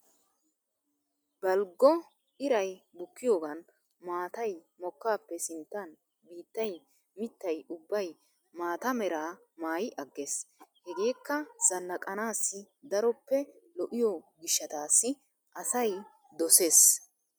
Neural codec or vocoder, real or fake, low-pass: none; real; 19.8 kHz